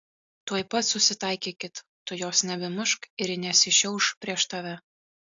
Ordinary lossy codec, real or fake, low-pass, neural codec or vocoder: AAC, 64 kbps; real; 7.2 kHz; none